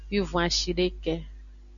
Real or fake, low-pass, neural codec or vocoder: real; 7.2 kHz; none